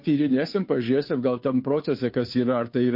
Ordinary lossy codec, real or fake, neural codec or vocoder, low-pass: MP3, 32 kbps; fake; codec, 16 kHz, 2 kbps, FunCodec, trained on Chinese and English, 25 frames a second; 5.4 kHz